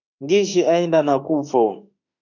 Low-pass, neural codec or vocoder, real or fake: 7.2 kHz; autoencoder, 48 kHz, 32 numbers a frame, DAC-VAE, trained on Japanese speech; fake